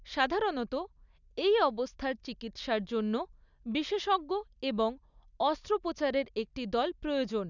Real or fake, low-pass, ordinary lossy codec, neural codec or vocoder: real; 7.2 kHz; none; none